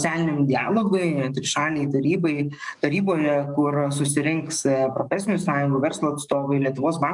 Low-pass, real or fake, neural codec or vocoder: 10.8 kHz; fake; codec, 44.1 kHz, 7.8 kbps, DAC